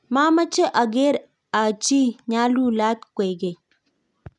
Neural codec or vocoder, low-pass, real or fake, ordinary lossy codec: none; 10.8 kHz; real; none